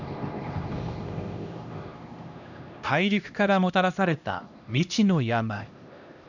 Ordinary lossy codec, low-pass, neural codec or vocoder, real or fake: none; 7.2 kHz; codec, 16 kHz, 1 kbps, X-Codec, HuBERT features, trained on LibriSpeech; fake